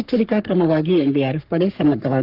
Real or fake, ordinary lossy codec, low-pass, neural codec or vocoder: fake; Opus, 24 kbps; 5.4 kHz; codec, 44.1 kHz, 3.4 kbps, Pupu-Codec